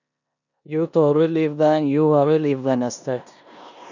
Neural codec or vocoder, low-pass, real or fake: codec, 16 kHz in and 24 kHz out, 0.9 kbps, LongCat-Audio-Codec, four codebook decoder; 7.2 kHz; fake